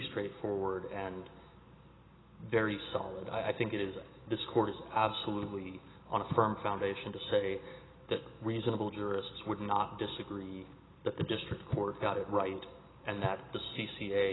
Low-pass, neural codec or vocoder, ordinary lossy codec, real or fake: 7.2 kHz; none; AAC, 16 kbps; real